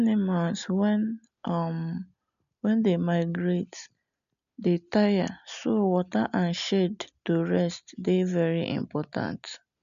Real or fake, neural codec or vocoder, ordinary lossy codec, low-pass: real; none; none; 7.2 kHz